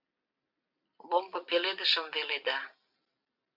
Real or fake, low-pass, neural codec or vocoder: real; 5.4 kHz; none